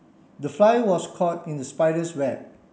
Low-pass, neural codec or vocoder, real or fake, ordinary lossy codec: none; none; real; none